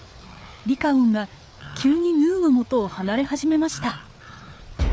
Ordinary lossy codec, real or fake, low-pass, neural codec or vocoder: none; fake; none; codec, 16 kHz, 4 kbps, FreqCodec, larger model